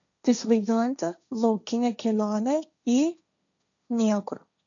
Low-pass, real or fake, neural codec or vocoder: 7.2 kHz; fake; codec, 16 kHz, 1.1 kbps, Voila-Tokenizer